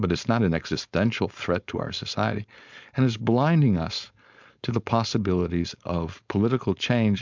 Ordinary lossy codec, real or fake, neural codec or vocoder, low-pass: MP3, 64 kbps; fake; codec, 16 kHz, 4.8 kbps, FACodec; 7.2 kHz